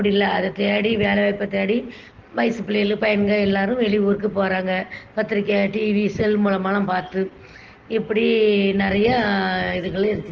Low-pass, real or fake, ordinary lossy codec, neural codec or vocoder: 7.2 kHz; real; Opus, 16 kbps; none